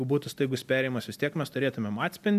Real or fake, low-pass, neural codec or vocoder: real; 14.4 kHz; none